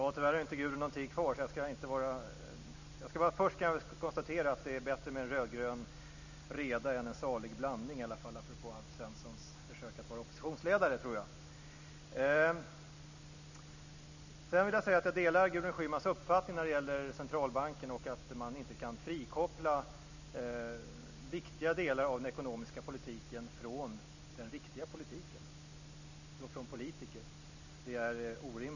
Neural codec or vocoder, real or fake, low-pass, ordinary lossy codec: none; real; 7.2 kHz; none